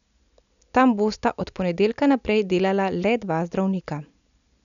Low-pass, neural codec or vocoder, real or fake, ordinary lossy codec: 7.2 kHz; none; real; none